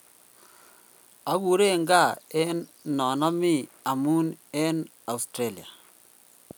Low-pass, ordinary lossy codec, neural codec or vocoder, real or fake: none; none; none; real